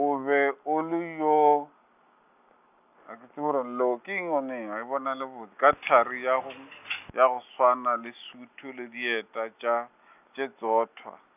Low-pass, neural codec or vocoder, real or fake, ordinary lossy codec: 3.6 kHz; none; real; none